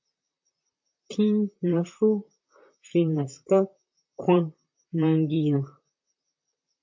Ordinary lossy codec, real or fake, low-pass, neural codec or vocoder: MP3, 48 kbps; fake; 7.2 kHz; vocoder, 44.1 kHz, 128 mel bands, Pupu-Vocoder